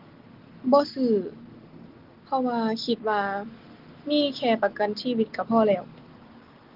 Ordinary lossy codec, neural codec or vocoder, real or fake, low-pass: Opus, 16 kbps; none; real; 5.4 kHz